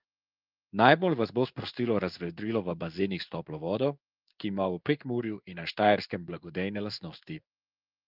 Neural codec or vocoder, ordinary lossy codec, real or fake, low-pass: codec, 16 kHz in and 24 kHz out, 1 kbps, XY-Tokenizer; Opus, 24 kbps; fake; 5.4 kHz